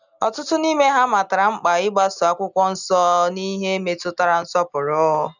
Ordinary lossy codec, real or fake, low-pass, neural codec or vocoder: none; real; 7.2 kHz; none